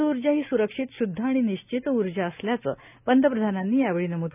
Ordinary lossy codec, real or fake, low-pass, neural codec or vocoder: none; real; 3.6 kHz; none